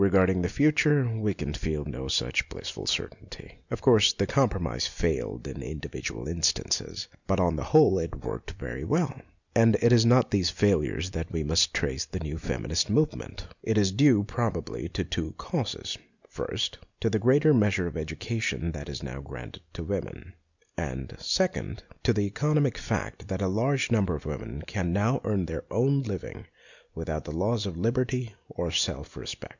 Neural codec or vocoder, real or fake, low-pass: none; real; 7.2 kHz